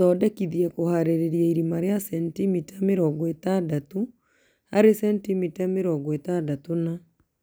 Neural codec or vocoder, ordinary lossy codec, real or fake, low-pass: none; none; real; none